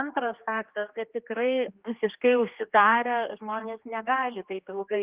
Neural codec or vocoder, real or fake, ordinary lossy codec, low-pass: codec, 16 kHz, 2 kbps, X-Codec, HuBERT features, trained on general audio; fake; Opus, 24 kbps; 3.6 kHz